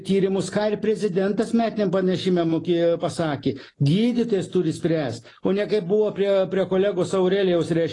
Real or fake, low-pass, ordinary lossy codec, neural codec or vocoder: real; 10.8 kHz; AAC, 32 kbps; none